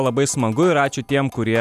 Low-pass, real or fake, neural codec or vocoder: 14.4 kHz; real; none